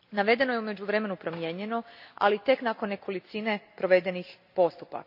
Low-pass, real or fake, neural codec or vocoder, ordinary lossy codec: 5.4 kHz; real; none; AAC, 48 kbps